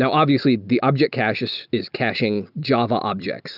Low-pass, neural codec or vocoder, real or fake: 5.4 kHz; none; real